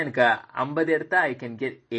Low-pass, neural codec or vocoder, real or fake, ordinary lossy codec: 9.9 kHz; none; real; MP3, 32 kbps